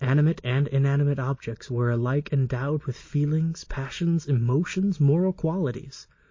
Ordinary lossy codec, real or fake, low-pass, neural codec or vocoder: MP3, 32 kbps; real; 7.2 kHz; none